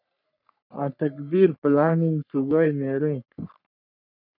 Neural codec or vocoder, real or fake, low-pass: codec, 44.1 kHz, 3.4 kbps, Pupu-Codec; fake; 5.4 kHz